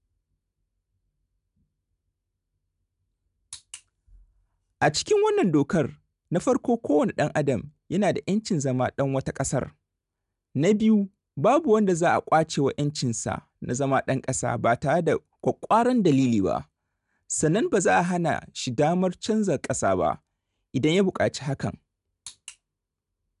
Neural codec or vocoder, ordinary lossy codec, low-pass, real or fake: none; none; 10.8 kHz; real